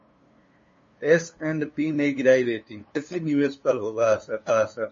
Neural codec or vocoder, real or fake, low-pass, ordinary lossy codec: codec, 16 kHz, 2 kbps, FunCodec, trained on LibriTTS, 25 frames a second; fake; 7.2 kHz; MP3, 32 kbps